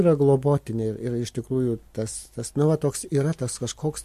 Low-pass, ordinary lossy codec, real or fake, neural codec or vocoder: 14.4 kHz; MP3, 64 kbps; real; none